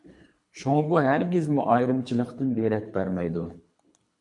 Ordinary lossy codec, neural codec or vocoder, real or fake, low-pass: MP3, 64 kbps; codec, 24 kHz, 3 kbps, HILCodec; fake; 10.8 kHz